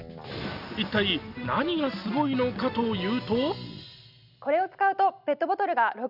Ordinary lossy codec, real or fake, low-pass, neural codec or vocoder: none; real; 5.4 kHz; none